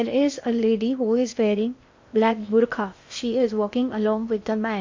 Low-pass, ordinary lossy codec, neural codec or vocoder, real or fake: 7.2 kHz; MP3, 48 kbps; codec, 16 kHz in and 24 kHz out, 0.8 kbps, FocalCodec, streaming, 65536 codes; fake